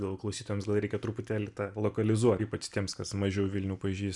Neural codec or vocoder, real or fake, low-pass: none; real; 10.8 kHz